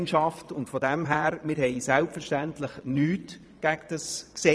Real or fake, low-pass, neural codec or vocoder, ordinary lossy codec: fake; none; vocoder, 22.05 kHz, 80 mel bands, Vocos; none